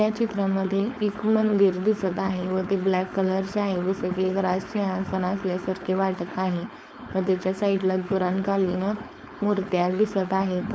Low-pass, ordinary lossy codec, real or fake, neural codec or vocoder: none; none; fake; codec, 16 kHz, 4.8 kbps, FACodec